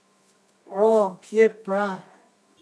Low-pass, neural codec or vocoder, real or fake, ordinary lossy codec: none; codec, 24 kHz, 0.9 kbps, WavTokenizer, medium music audio release; fake; none